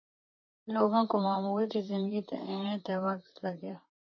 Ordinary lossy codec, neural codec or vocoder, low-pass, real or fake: MP3, 32 kbps; vocoder, 44.1 kHz, 128 mel bands, Pupu-Vocoder; 7.2 kHz; fake